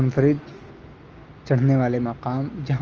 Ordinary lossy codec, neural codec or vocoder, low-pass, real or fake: Opus, 24 kbps; none; 7.2 kHz; real